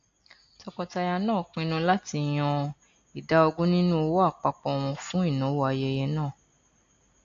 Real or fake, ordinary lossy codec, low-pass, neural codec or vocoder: real; AAC, 48 kbps; 7.2 kHz; none